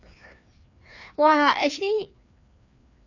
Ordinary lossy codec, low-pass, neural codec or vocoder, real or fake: none; 7.2 kHz; codec, 16 kHz, 2 kbps, FreqCodec, larger model; fake